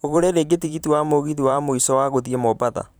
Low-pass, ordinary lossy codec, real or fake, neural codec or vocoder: none; none; fake; vocoder, 44.1 kHz, 128 mel bands every 512 samples, BigVGAN v2